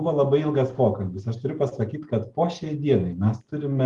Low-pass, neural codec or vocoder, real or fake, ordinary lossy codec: 10.8 kHz; none; real; Opus, 24 kbps